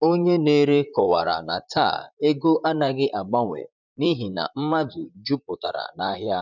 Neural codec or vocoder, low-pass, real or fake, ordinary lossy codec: vocoder, 44.1 kHz, 128 mel bands, Pupu-Vocoder; 7.2 kHz; fake; none